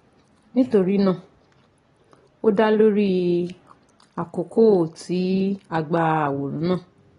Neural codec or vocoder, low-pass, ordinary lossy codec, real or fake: none; 10.8 kHz; AAC, 32 kbps; real